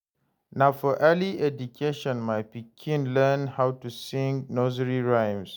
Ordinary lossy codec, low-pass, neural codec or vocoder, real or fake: none; none; none; real